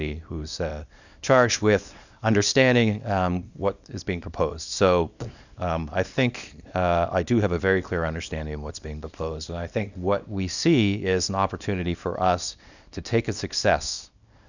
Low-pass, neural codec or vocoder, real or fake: 7.2 kHz; codec, 24 kHz, 0.9 kbps, WavTokenizer, small release; fake